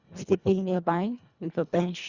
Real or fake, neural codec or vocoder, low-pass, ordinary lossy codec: fake; codec, 24 kHz, 1.5 kbps, HILCodec; 7.2 kHz; Opus, 64 kbps